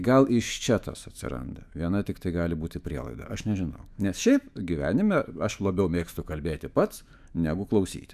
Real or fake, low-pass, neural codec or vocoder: fake; 14.4 kHz; autoencoder, 48 kHz, 128 numbers a frame, DAC-VAE, trained on Japanese speech